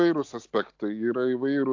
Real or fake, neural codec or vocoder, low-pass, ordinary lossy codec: real; none; 7.2 kHz; AAC, 48 kbps